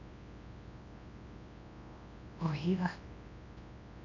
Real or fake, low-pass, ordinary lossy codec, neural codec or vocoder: fake; 7.2 kHz; none; codec, 24 kHz, 0.9 kbps, WavTokenizer, large speech release